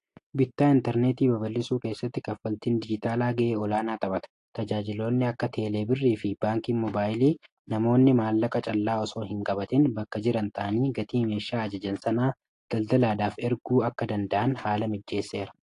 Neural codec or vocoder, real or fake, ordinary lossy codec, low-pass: none; real; AAC, 48 kbps; 10.8 kHz